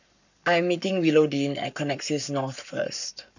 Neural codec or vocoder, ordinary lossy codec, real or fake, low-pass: codec, 44.1 kHz, 7.8 kbps, Pupu-Codec; none; fake; 7.2 kHz